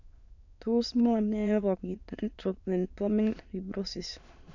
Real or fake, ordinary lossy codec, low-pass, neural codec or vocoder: fake; none; 7.2 kHz; autoencoder, 22.05 kHz, a latent of 192 numbers a frame, VITS, trained on many speakers